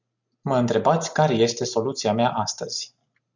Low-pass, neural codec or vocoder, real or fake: 7.2 kHz; none; real